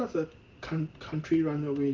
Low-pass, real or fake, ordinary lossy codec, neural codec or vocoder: 7.2 kHz; real; Opus, 16 kbps; none